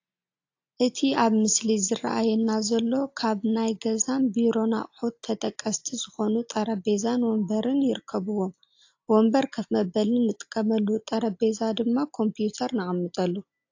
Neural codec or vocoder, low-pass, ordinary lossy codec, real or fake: none; 7.2 kHz; AAC, 48 kbps; real